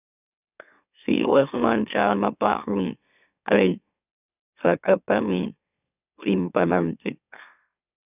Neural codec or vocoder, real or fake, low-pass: autoencoder, 44.1 kHz, a latent of 192 numbers a frame, MeloTTS; fake; 3.6 kHz